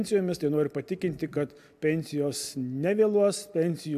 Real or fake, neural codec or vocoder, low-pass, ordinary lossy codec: real; none; 14.4 kHz; AAC, 96 kbps